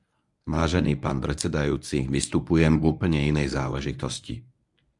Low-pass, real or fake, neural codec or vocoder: 10.8 kHz; fake; codec, 24 kHz, 0.9 kbps, WavTokenizer, medium speech release version 1